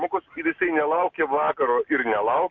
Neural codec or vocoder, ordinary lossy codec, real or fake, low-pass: none; MP3, 48 kbps; real; 7.2 kHz